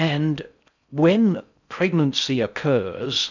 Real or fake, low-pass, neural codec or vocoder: fake; 7.2 kHz; codec, 16 kHz in and 24 kHz out, 0.6 kbps, FocalCodec, streaming, 4096 codes